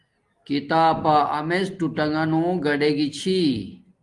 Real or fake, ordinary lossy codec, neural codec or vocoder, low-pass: real; Opus, 24 kbps; none; 10.8 kHz